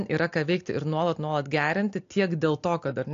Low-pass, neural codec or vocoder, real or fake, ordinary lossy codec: 7.2 kHz; none; real; AAC, 48 kbps